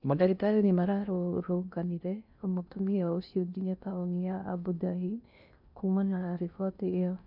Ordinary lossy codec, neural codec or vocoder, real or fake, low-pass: none; codec, 16 kHz in and 24 kHz out, 0.6 kbps, FocalCodec, streaming, 2048 codes; fake; 5.4 kHz